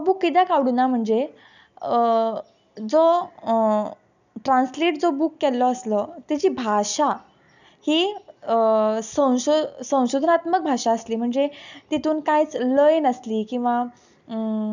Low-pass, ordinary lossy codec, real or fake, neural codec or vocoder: 7.2 kHz; none; real; none